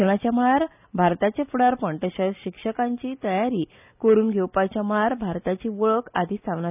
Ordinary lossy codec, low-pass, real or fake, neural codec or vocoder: none; 3.6 kHz; real; none